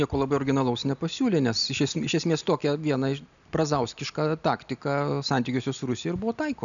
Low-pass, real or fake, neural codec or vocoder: 7.2 kHz; real; none